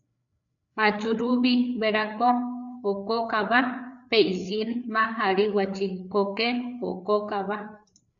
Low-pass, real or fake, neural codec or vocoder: 7.2 kHz; fake; codec, 16 kHz, 4 kbps, FreqCodec, larger model